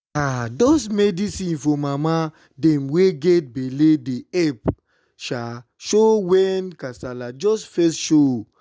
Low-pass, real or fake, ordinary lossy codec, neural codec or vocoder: none; real; none; none